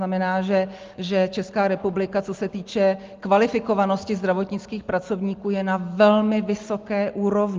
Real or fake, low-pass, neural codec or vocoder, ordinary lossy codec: real; 7.2 kHz; none; Opus, 24 kbps